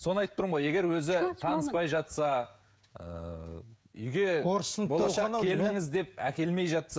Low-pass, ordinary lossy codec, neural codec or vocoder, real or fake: none; none; codec, 16 kHz, 16 kbps, FreqCodec, smaller model; fake